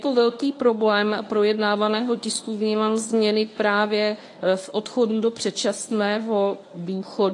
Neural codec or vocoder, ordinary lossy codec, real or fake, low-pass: codec, 24 kHz, 0.9 kbps, WavTokenizer, medium speech release version 1; AAC, 48 kbps; fake; 10.8 kHz